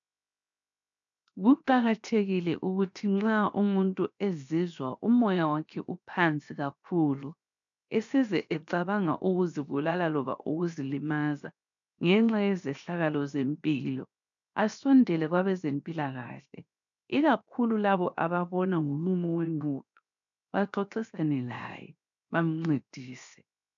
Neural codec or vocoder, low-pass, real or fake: codec, 16 kHz, 0.7 kbps, FocalCodec; 7.2 kHz; fake